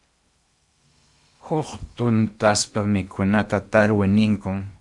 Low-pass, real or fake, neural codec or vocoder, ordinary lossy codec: 10.8 kHz; fake; codec, 16 kHz in and 24 kHz out, 0.8 kbps, FocalCodec, streaming, 65536 codes; Opus, 64 kbps